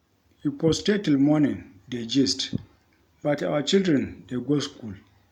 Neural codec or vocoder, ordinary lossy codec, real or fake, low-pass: none; none; real; 19.8 kHz